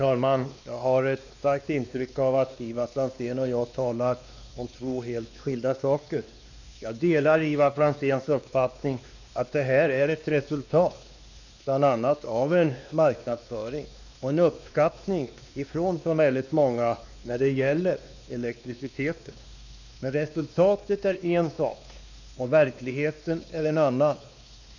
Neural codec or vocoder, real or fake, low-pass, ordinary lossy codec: codec, 16 kHz, 2 kbps, X-Codec, WavLM features, trained on Multilingual LibriSpeech; fake; 7.2 kHz; none